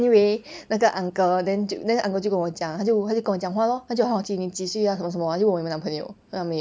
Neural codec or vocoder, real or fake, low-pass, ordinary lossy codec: none; real; none; none